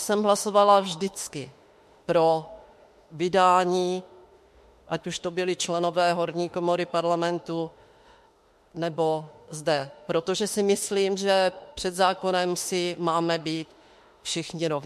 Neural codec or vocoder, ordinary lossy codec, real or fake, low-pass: autoencoder, 48 kHz, 32 numbers a frame, DAC-VAE, trained on Japanese speech; MP3, 64 kbps; fake; 14.4 kHz